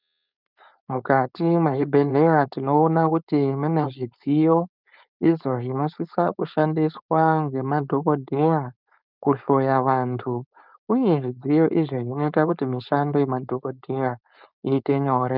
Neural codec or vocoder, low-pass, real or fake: codec, 16 kHz, 4.8 kbps, FACodec; 5.4 kHz; fake